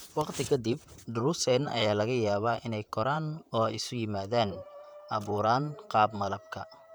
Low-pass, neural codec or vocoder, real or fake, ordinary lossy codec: none; vocoder, 44.1 kHz, 128 mel bands, Pupu-Vocoder; fake; none